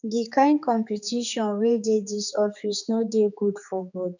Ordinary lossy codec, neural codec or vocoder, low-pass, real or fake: none; codec, 16 kHz, 4 kbps, X-Codec, HuBERT features, trained on general audio; 7.2 kHz; fake